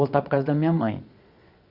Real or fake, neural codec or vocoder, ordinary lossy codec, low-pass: fake; vocoder, 22.05 kHz, 80 mel bands, Vocos; Opus, 64 kbps; 5.4 kHz